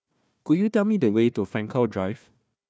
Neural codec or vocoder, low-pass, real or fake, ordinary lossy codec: codec, 16 kHz, 1 kbps, FunCodec, trained on Chinese and English, 50 frames a second; none; fake; none